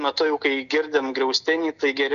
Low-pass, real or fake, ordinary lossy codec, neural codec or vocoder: 7.2 kHz; real; MP3, 96 kbps; none